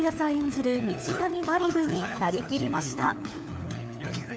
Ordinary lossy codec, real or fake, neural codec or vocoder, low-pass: none; fake; codec, 16 kHz, 4 kbps, FunCodec, trained on LibriTTS, 50 frames a second; none